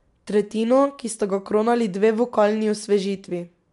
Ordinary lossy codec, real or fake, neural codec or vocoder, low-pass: MP3, 64 kbps; real; none; 10.8 kHz